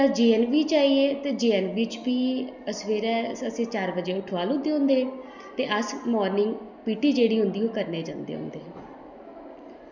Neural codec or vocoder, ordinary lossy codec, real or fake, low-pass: none; none; real; 7.2 kHz